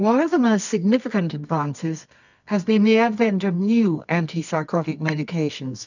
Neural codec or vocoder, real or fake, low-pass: codec, 24 kHz, 0.9 kbps, WavTokenizer, medium music audio release; fake; 7.2 kHz